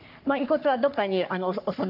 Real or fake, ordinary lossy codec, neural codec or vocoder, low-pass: fake; AAC, 48 kbps; codec, 44.1 kHz, 3.4 kbps, Pupu-Codec; 5.4 kHz